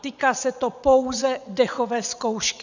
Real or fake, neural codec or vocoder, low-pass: fake; vocoder, 22.05 kHz, 80 mel bands, WaveNeXt; 7.2 kHz